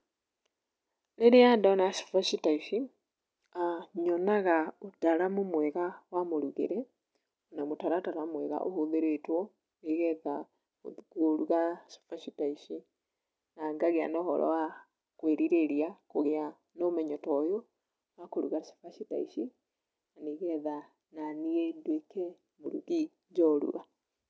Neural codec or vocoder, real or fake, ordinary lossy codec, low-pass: none; real; none; none